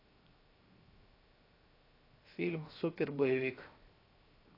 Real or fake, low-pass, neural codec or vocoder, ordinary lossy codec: fake; 5.4 kHz; codec, 16 kHz, 0.7 kbps, FocalCodec; AAC, 24 kbps